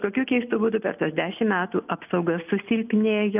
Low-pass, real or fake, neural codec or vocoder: 3.6 kHz; real; none